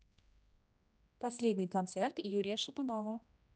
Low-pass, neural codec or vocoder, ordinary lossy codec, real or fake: none; codec, 16 kHz, 1 kbps, X-Codec, HuBERT features, trained on general audio; none; fake